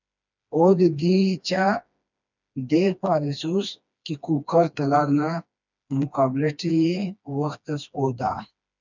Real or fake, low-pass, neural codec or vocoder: fake; 7.2 kHz; codec, 16 kHz, 2 kbps, FreqCodec, smaller model